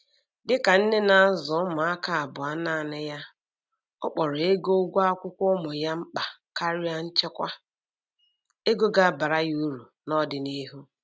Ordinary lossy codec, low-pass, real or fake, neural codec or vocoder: none; none; real; none